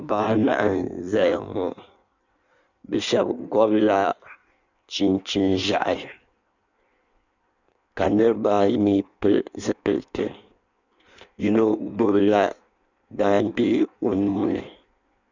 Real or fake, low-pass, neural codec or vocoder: fake; 7.2 kHz; codec, 16 kHz in and 24 kHz out, 1.1 kbps, FireRedTTS-2 codec